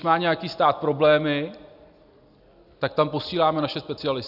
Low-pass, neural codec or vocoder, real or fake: 5.4 kHz; none; real